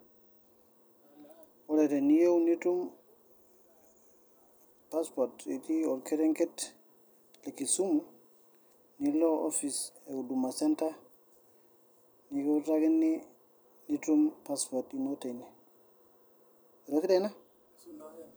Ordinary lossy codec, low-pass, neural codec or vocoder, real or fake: none; none; none; real